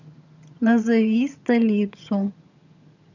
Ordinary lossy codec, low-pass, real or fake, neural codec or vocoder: none; 7.2 kHz; fake; vocoder, 22.05 kHz, 80 mel bands, HiFi-GAN